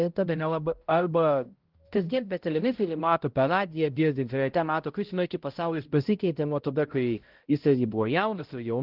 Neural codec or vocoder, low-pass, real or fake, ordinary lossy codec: codec, 16 kHz, 0.5 kbps, X-Codec, HuBERT features, trained on balanced general audio; 5.4 kHz; fake; Opus, 16 kbps